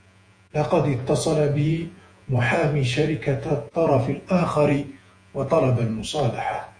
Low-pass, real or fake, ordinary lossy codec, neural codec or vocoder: 9.9 kHz; fake; AAC, 48 kbps; vocoder, 48 kHz, 128 mel bands, Vocos